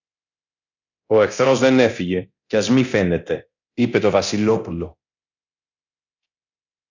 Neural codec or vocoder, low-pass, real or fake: codec, 24 kHz, 0.9 kbps, DualCodec; 7.2 kHz; fake